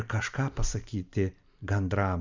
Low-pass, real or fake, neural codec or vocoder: 7.2 kHz; real; none